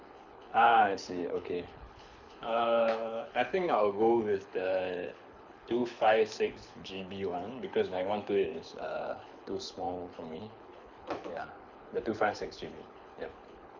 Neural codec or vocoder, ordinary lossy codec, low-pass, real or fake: codec, 24 kHz, 6 kbps, HILCodec; none; 7.2 kHz; fake